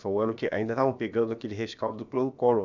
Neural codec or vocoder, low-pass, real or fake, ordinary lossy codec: codec, 16 kHz, about 1 kbps, DyCAST, with the encoder's durations; 7.2 kHz; fake; none